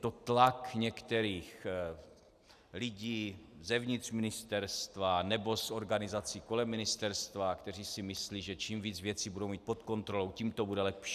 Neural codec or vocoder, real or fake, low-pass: none; real; 14.4 kHz